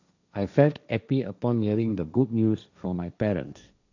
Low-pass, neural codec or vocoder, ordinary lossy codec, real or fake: 7.2 kHz; codec, 16 kHz, 1.1 kbps, Voila-Tokenizer; none; fake